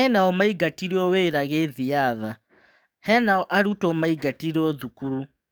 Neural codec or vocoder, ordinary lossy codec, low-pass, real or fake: codec, 44.1 kHz, 7.8 kbps, DAC; none; none; fake